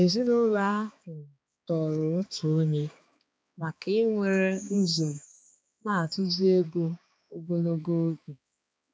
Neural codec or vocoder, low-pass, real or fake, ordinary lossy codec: codec, 16 kHz, 2 kbps, X-Codec, HuBERT features, trained on balanced general audio; none; fake; none